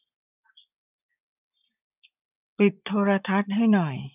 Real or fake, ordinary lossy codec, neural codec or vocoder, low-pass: fake; none; vocoder, 24 kHz, 100 mel bands, Vocos; 3.6 kHz